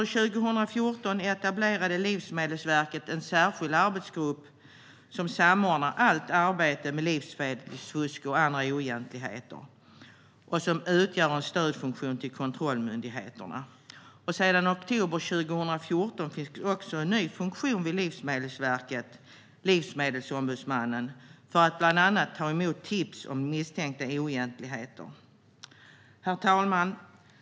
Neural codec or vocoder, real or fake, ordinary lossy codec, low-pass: none; real; none; none